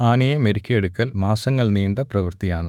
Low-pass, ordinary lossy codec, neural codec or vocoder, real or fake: 19.8 kHz; none; autoencoder, 48 kHz, 32 numbers a frame, DAC-VAE, trained on Japanese speech; fake